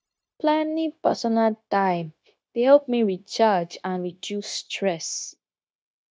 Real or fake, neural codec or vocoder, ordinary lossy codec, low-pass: fake; codec, 16 kHz, 0.9 kbps, LongCat-Audio-Codec; none; none